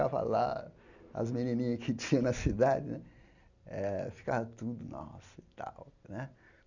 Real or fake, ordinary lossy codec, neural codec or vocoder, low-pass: real; none; none; 7.2 kHz